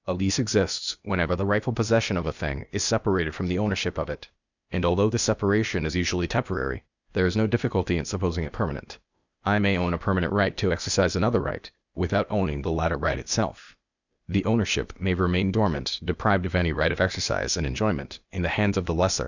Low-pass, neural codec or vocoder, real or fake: 7.2 kHz; codec, 16 kHz, 0.8 kbps, ZipCodec; fake